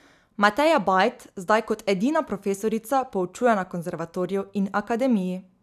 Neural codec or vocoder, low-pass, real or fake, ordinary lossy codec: none; 14.4 kHz; real; none